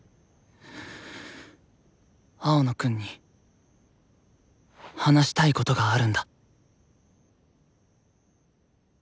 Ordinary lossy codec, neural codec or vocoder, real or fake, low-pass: none; none; real; none